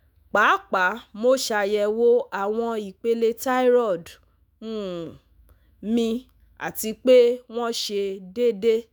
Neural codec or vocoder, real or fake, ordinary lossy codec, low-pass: autoencoder, 48 kHz, 128 numbers a frame, DAC-VAE, trained on Japanese speech; fake; none; none